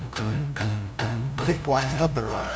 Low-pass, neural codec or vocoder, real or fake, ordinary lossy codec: none; codec, 16 kHz, 0.5 kbps, FunCodec, trained on LibriTTS, 25 frames a second; fake; none